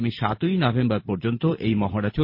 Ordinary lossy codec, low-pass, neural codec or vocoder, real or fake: MP3, 32 kbps; 5.4 kHz; vocoder, 44.1 kHz, 128 mel bands every 256 samples, BigVGAN v2; fake